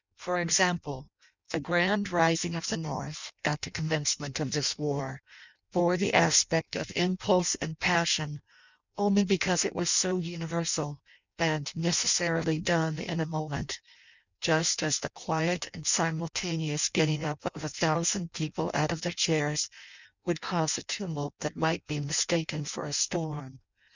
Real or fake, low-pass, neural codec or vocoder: fake; 7.2 kHz; codec, 16 kHz in and 24 kHz out, 0.6 kbps, FireRedTTS-2 codec